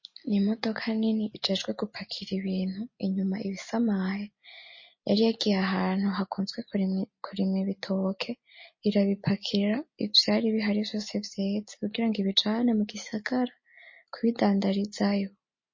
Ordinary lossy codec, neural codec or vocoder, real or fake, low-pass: MP3, 32 kbps; none; real; 7.2 kHz